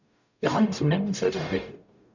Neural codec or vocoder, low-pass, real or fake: codec, 44.1 kHz, 0.9 kbps, DAC; 7.2 kHz; fake